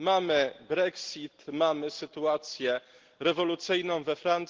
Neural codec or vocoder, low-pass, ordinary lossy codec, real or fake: none; 7.2 kHz; Opus, 16 kbps; real